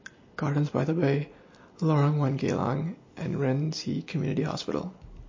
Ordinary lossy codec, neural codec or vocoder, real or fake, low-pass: MP3, 32 kbps; none; real; 7.2 kHz